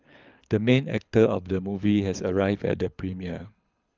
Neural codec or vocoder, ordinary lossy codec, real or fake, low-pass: codec, 24 kHz, 6 kbps, HILCodec; Opus, 24 kbps; fake; 7.2 kHz